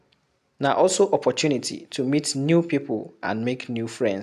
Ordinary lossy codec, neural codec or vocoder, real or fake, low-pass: AAC, 96 kbps; none; real; 14.4 kHz